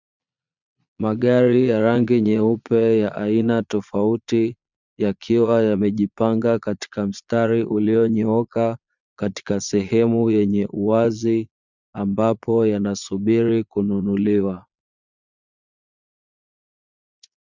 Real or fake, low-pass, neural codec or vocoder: fake; 7.2 kHz; vocoder, 22.05 kHz, 80 mel bands, Vocos